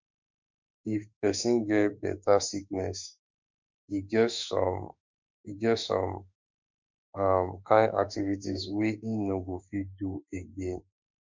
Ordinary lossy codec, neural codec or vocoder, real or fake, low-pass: MP3, 64 kbps; autoencoder, 48 kHz, 32 numbers a frame, DAC-VAE, trained on Japanese speech; fake; 7.2 kHz